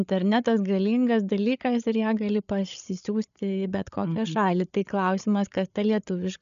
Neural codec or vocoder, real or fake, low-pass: codec, 16 kHz, 8 kbps, FreqCodec, larger model; fake; 7.2 kHz